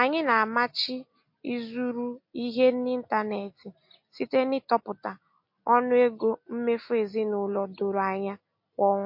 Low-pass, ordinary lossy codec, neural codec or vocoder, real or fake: 5.4 kHz; MP3, 32 kbps; none; real